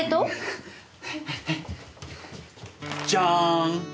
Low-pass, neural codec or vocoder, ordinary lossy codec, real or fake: none; none; none; real